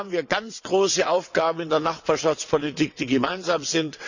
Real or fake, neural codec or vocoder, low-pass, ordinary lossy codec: fake; vocoder, 22.05 kHz, 80 mel bands, WaveNeXt; 7.2 kHz; none